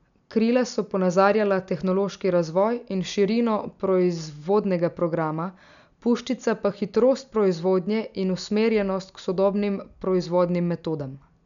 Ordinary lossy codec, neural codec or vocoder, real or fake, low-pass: none; none; real; 7.2 kHz